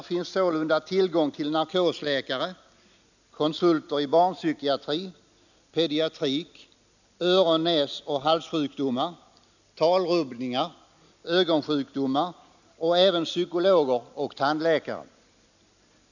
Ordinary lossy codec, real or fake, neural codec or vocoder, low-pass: none; real; none; 7.2 kHz